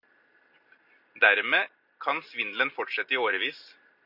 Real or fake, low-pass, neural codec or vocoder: real; 5.4 kHz; none